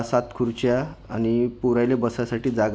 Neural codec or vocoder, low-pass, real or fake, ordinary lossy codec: none; none; real; none